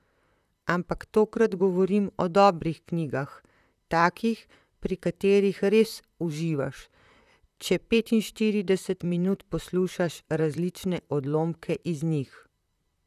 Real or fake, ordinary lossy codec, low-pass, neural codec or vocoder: fake; none; 14.4 kHz; vocoder, 44.1 kHz, 128 mel bands, Pupu-Vocoder